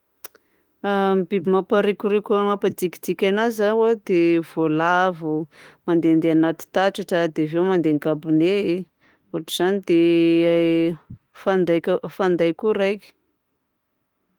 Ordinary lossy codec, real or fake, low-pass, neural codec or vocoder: Opus, 24 kbps; fake; 19.8 kHz; autoencoder, 48 kHz, 32 numbers a frame, DAC-VAE, trained on Japanese speech